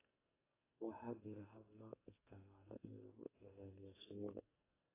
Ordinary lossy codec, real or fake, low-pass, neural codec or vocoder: AAC, 16 kbps; fake; 3.6 kHz; codec, 44.1 kHz, 2.6 kbps, SNAC